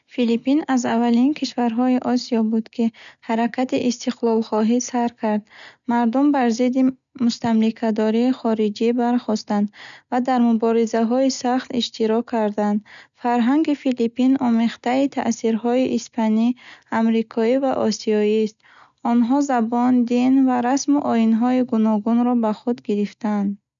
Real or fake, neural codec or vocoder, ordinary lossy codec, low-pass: real; none; none; 7.2 kHz